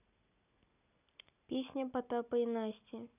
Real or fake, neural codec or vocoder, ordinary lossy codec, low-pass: real; none; none; 3.6 kHz